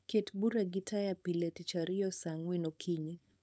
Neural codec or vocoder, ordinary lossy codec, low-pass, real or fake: codec, 16 kHz, 4.8 kbps, FACodec; none; none; fake